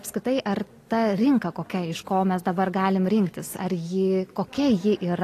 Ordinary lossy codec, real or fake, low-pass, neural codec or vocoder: AAC, 48 kbps; real; 14.4 kHz; none